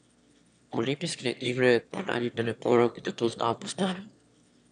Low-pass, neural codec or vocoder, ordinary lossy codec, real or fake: 9.9 kHz; autoencoder, 22.05 kHz, a latent of 192 numbers a frame, VITS, trained on one speaker; none; fake